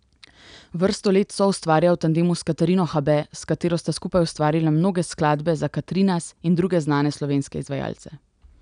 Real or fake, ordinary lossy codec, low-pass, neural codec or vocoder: real; none; 9.9 kHz; none